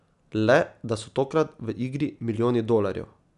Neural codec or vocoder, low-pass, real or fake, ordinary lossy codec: none; 10.8 kHz; real; none